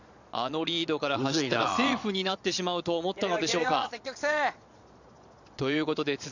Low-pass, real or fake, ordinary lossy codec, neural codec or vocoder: 7.2 kHz; fake; none; vocoder, 22.05 kHz, 80 mel bands, Vocos